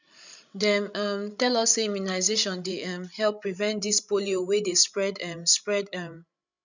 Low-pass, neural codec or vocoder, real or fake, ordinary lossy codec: 7.2 kHz; codec, 16 kHz, 16 kbps, FreqCodec, larger model; fake; none